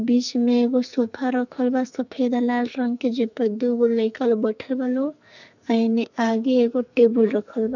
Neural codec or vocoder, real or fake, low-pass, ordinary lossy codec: codec, 44.1 kHz, 2.6 kbps, SNAC; fake; 7.2 kHz; none